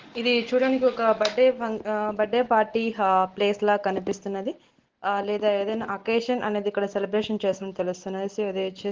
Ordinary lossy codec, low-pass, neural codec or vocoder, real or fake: Opus, 16 kbps; 7.2 kHz; none; real